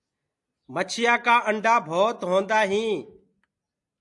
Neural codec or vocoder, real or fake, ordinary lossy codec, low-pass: none; real; AAC, 64 kbps; 10.8 kHz